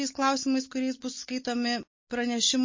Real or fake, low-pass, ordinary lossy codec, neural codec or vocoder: real; 7.2 kHz; MP3, 32 kbps; none